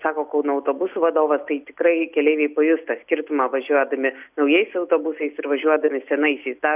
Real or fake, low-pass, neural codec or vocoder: real; 3.6 kHz; none